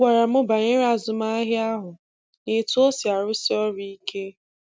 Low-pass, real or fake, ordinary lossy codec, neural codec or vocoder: none; real; none; none